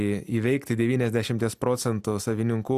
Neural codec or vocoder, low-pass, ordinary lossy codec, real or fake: none; 14.4 kHz; Opus, 64 kbps; real